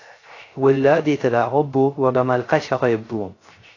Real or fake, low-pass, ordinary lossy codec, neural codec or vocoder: fake; 7.2 kHz; AAC, 32 kbps; codec, 16 kHz, 0.3 kbps, FocalCodec